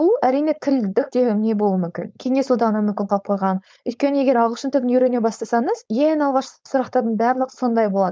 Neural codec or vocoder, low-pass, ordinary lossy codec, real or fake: codec, 16 kHz, 4.8 kbps, FACodec; none; none; fake